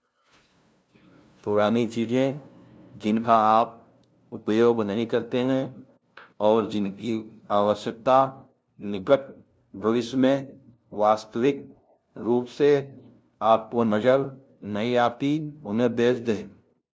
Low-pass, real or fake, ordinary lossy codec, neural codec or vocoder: none; fake; none; codec, 16 kHz, 0.5 kbps, FunCodec, trained on LibriTTS, 25 frames a second